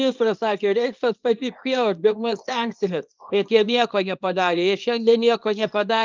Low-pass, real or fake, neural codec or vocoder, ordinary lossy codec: 7.2 kHz; fake; codec, 24 kHz, 0.9 kbps, WavTokenizer, small release; Opus, 24 kbps